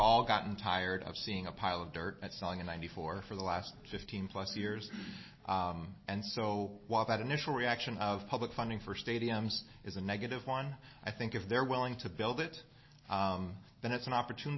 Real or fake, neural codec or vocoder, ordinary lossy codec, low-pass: real; none; MP3, 24 kbps; 7.2 kHz